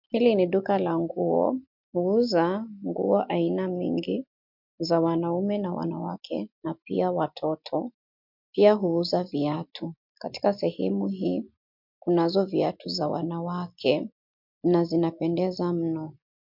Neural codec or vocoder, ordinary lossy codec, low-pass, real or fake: none; MP3, 48 kbps; 5.4 kHz; real